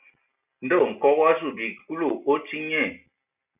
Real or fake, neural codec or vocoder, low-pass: real; none; 3.6 kHz